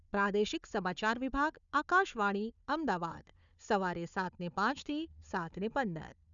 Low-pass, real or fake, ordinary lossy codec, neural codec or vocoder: 7.2 kHz; fake; none; codec, 16 kHz, 4 kbps, FunCodec, trained on Chinese and English, 50 frames a second